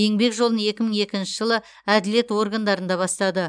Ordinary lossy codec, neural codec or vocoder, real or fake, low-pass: none; none; real; 9.9 kHz